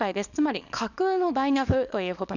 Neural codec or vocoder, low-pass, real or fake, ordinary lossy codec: codec, 24 kHz, 0.9 kbps, WavTokenizer, small release; 7.2 kHz; fake; none